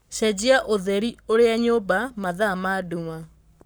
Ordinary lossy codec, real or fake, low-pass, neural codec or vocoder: none; fake; none; codec, 44.1 kHz, 7.8 kbps, Pupu-Codec